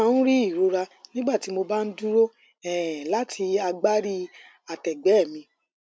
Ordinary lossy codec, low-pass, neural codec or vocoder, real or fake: none; none; none; real